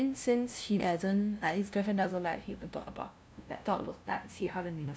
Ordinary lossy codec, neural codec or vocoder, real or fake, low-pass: none; codec, 16 kHz, 0.5 kbps, FunCodec, trained on LibriTTS, 25 frames a second; fake; none